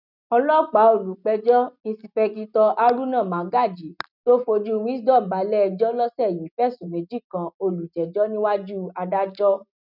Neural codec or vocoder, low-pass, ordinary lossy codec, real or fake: none; 5.4 kHz; none; real